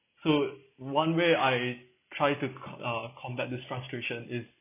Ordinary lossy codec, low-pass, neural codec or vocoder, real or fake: none; 3.6 kHz; none; real